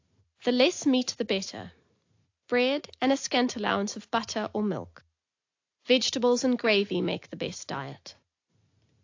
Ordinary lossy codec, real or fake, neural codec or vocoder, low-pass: AAC, 48 kbps; real; none; 7.2 kHz